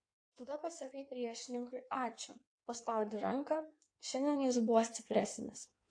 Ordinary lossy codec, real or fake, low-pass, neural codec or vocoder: AAC, 48 kbps; fake; 9.9 kHz; codec, 16 kHz in and 24 kHz out, 1.1 kbps, FireRedTTS-2 codec